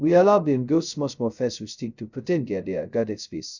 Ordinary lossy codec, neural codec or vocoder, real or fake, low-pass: none; codec, 16 kHz, 0.2 kbps, FocalCodec; fake; 7.2 kHz